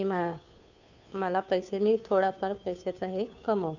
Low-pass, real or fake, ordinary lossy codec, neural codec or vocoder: 7.2 kHz; fake; none; codec, 16 kHz, 2 kbps, FunCodec, trained on Chinese and English, 25 frames a second